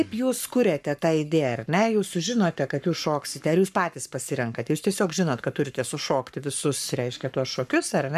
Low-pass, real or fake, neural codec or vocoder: 14.4 kHz; fake; codec, 44.1 kHz, 7.8 kbps, Pupu-Codec